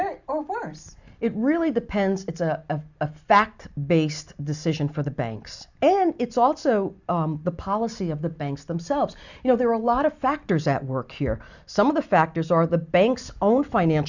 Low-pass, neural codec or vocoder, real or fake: 7.2 kHz; none; real